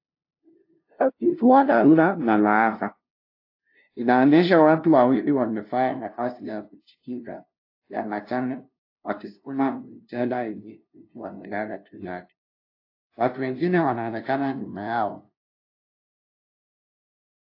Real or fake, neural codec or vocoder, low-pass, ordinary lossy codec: fake; codec, 16 kHz, 0.5 kbps, FunCodec, trained on LibriTTS, 25 frames a second; 5.4 kHz; AAC, 32 kbps